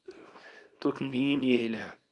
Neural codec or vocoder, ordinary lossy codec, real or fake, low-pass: codec, 24 kHz, 0.9 kbps, WavTokenizer, small release; Opus, 64 kbps; fake; 10.8 kHz